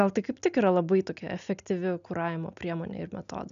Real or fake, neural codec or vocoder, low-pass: real; none; 7.2 kHz